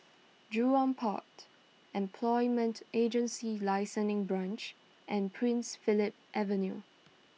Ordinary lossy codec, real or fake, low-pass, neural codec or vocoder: none; real; none; none